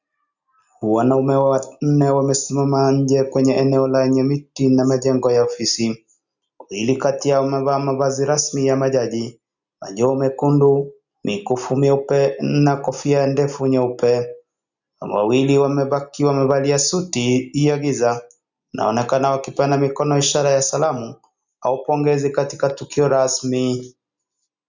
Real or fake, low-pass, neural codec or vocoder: real; 7.2 kHz; none